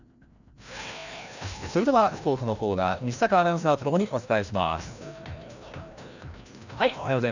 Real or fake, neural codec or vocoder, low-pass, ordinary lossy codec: fake; codec, 16 kHz, 1 kbps, FreqCodec, larger model; 7.2 kHz; none